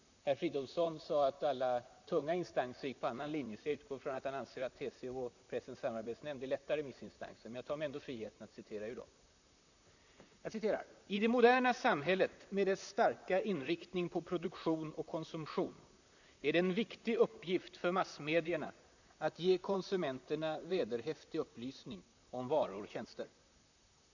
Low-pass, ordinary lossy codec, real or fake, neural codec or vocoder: 7.2 kHz; none; fake; vocoder, 44.1 kHz, 128 mel bands, Pupu-Vocoder